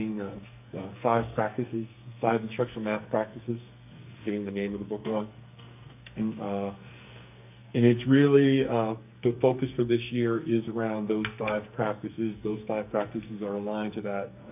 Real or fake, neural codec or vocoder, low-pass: fake; codec, 44.1 kHz, 2.6 kbps, SNAC; 3.6 kHz